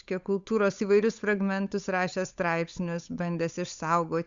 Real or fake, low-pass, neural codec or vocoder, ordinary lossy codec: fake; 7.2 kHz; codec, 16 kHz, 8 kbps, FunCodec, trained on Chinese and English, 25 frames a second; Opus, 64 kbps